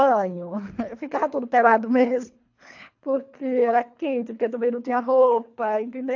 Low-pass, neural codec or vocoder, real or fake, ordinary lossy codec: 7.2 kHz; codec, 24 kHz, 3 kbps, HILCodec; fake; AAC, 48 kbps